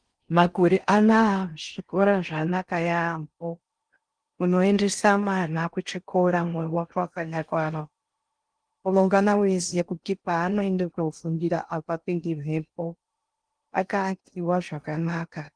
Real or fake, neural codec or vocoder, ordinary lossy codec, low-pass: fake; codec, 16 kHz in and 24 kHz out, 0.6 kbps, FocalCodec, streaming, 4096 codes; Opus, 24 kbps; 9.9 kHz